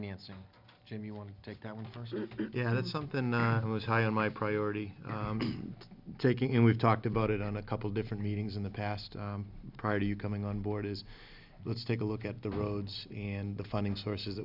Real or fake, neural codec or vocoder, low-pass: real; none; 5.4 kHz